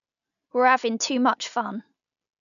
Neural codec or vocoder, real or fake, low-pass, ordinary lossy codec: none; real; 7.2 kHz; MP3, 96 kbps